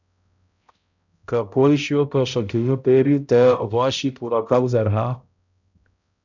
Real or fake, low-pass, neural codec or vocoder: fake; 7.2 kHz; codec, 16 kHz, 0.5 kbps, X-Codec, HuBERT features, trained on balanced general audio